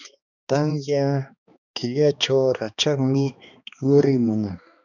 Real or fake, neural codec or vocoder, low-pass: fake; codec, 16 kHz, 2 kbps, X-Codec, HuBERT features, trained on balanced general audio; 7.2 kHz